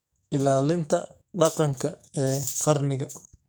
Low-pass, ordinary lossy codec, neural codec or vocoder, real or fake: none; none; codec, 44.1 kHz, 2.6 kbps, SNAC; fake